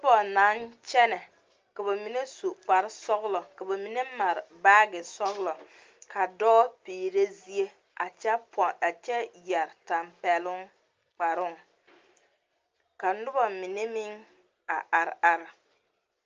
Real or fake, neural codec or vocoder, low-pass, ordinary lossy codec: real; none; 7.2 kHz; Opus, 24 kbps